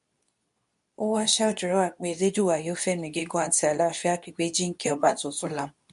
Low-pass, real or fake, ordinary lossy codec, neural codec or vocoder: 10.8 kHz; fake; MP3, 64 kbps; codec, 24 kHz, 0.9 kbps, WavTokenizer, medium speech release version 2